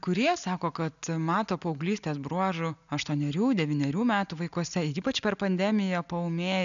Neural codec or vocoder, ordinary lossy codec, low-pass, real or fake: none; MP3, 96 kbps; 7.2 kHz; real